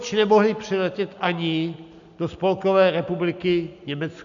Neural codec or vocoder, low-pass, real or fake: none; 7.2 kHz; real